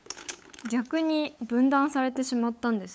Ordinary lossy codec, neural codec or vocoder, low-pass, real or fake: none; codec, 16 kHz, 8 kbps, FunCodec, trained on LibriTTS, 25 frames a second; none; fake